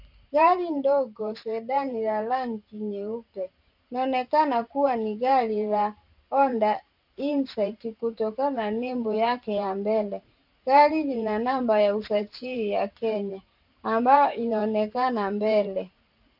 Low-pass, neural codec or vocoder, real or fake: 5.4 kHz; vocoder, 44.1 kHz, 128 mel bands every 512 samples, BigVGAN v2; fake